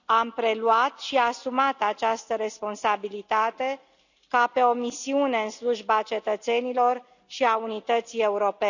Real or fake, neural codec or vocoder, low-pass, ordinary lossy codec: real; none; 7.2 kHz; none